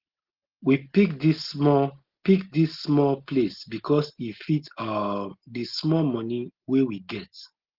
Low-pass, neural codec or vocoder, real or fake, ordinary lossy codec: 5.4 kHz; none; real; Opus, 16 kbps